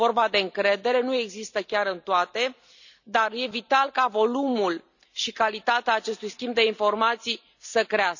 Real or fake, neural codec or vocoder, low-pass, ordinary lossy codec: real; none; 7.2 kHz; none